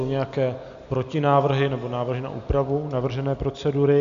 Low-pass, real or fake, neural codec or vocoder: 7.2 kHz; real; none